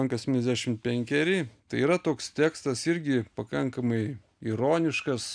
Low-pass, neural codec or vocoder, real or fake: 9.9 kHz; none; real